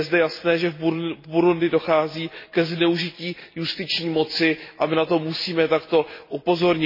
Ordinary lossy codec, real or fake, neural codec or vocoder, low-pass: MP3, 24 kbps; real; none; 5.4 kHz